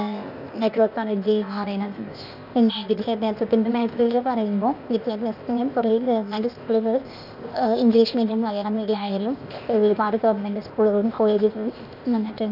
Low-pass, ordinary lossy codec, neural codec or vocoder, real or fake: 5.4 kHz; none; codec, 16 kHz, 0.8 kbps, ZipCodec; fake